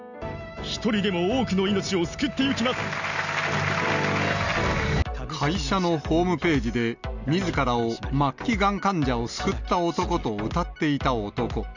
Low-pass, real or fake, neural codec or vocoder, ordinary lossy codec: 7.2 kHz; real; none; none